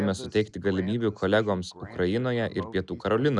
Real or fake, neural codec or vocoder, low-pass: real; none; 10.8 kHz